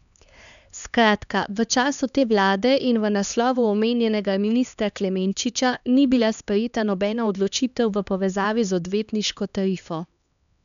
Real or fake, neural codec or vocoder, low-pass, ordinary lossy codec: fake; codec, 16 kHz, 2 kbps, X-Codec, HuBERT features, trained on LibriSpeech; 7.2 kHz; none